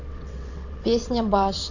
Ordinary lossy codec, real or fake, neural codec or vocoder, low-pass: none; fake; vocoder, 22.05 kHz, 80 mel bands, WaveNeXt; 7.2 kHz